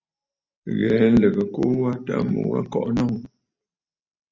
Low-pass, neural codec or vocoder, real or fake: 7.2 kHz; none; real